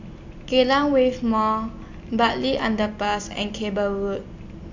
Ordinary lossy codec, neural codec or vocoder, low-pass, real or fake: AAC, 48 kbps; none; 7.2 kHz; real